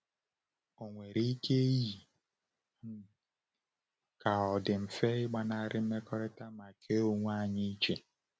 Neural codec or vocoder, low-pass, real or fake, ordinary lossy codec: none; none; real; none